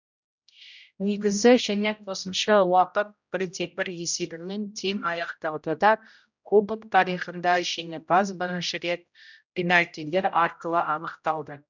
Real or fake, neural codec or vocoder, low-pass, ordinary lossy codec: fake; codec, 16 kHz, 0.5 kbps, X-Codec, HuBERT features, trained on general audio; 7.2 kHz; none